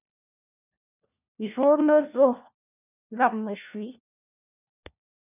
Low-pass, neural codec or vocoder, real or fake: 3.6 kHz; codec, 16 kHz, 1 kbps, FunCodec, trained on LibriTTS, 50 frames a second; fake